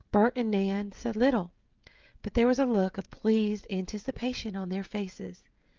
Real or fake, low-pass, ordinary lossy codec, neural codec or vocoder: fake; 7.2 kHz; Opus, 32 kbps; codec, 16 kHz, 16 kbps, FreqCodec, smaller model